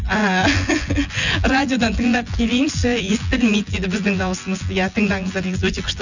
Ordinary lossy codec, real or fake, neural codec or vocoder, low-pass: none; fake; vocoder, 24 kHz, 100 mel bands, Vocos; 7.2 kHz